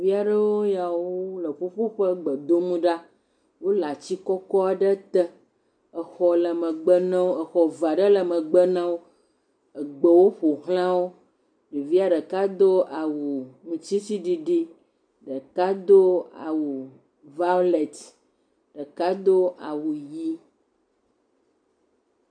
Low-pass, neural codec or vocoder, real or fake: 9.9 kHz; none; real